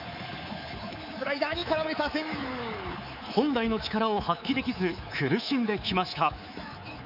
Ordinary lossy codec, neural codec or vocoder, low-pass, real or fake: none; codec, 24 kHz, 3.1 kbps, DualCodec; 5.4 kHz; fake